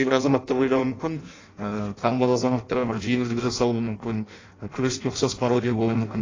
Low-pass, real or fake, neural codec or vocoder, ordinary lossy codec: 7.2 kHz; fake; codec, 16 kHz in and 24 kHz out, 0.6 kbps, FireRedTTS-2 codec; AAC, 32 kbps